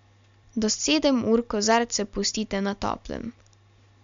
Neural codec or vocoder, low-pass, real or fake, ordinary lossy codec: none; 7.2 kHz; real; MP3, 64 kbps